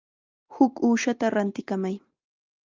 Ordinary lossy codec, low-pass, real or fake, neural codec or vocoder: Opus, 24 kbps; 7.2 kHz; real; none